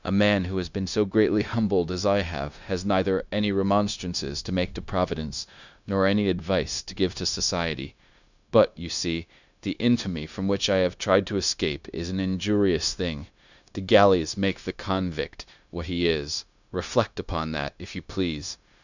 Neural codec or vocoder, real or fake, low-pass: codec, 16 kHz, 0.9 kbps, LongCat-Audio-Codec; fake; 7.2 kHz